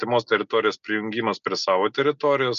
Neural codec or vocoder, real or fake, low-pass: none; real; 7.2 kHz